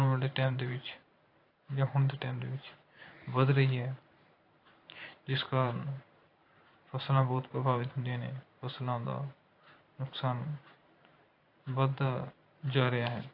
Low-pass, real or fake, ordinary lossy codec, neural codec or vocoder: 5.4 kHz; fake; AAC, 32 kbps; vocoder, 44.1 kHz, 128 mel bands every 512 samples, BigVGAN v2